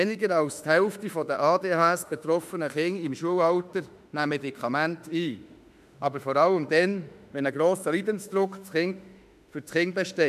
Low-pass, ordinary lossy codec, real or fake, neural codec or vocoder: 14.4 kHz; none; fake; autoencoder, 48 kHz, 32 numbers a frame, DAC-VAE, trained on Japanese speech